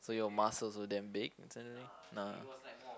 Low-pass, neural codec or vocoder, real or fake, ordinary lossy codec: none; none; real; none